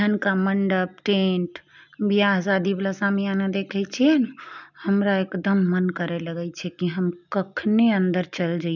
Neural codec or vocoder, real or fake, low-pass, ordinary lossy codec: none; real; 7.2 kHz; none